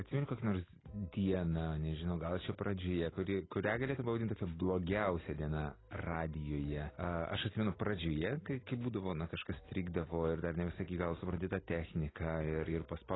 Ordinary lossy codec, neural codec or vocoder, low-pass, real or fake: AAC, 16 kbps; none; 7.2 kHz; real